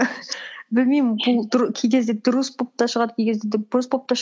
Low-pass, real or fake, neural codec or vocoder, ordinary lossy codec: none; real; none; none